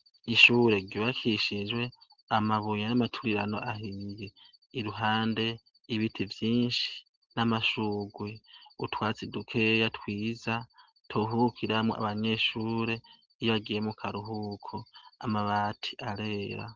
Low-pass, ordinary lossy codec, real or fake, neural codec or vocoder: 7.2 kHz; Opus, 16 kbps; real; none